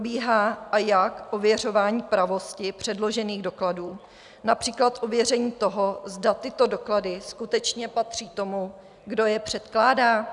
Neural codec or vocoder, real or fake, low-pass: none; real; 10.8 kHz